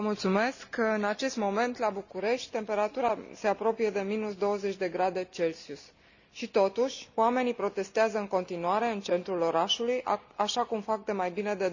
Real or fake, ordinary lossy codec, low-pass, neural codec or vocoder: real; none; 7.2 kHz; none